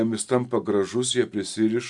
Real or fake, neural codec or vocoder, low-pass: real; none; 10.8 kHz